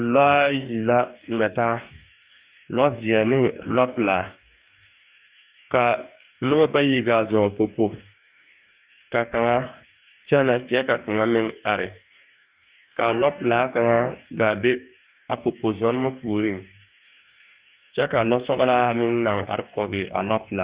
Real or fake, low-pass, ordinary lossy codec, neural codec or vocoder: fake; 3.6 kHz; Opus, 64 kbps; codec, 44.1 kHz, 2.6 kbps, DAC